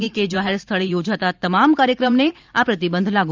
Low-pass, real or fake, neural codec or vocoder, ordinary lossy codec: 7.2 kHz; fake; vocoder, 44.1 kHz, 128 mel bands every 512 samples, BigVGAN v2; Opus, 24 kbps